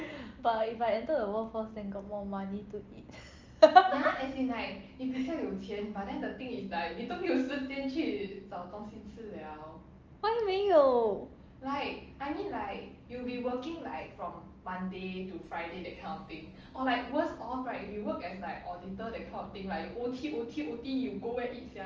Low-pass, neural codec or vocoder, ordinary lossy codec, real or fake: 7.2 kHz; none; Opus, 24 kbps; real